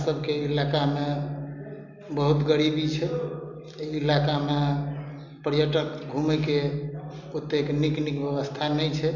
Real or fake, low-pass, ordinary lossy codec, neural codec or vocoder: real; 7.2 kHz; none; none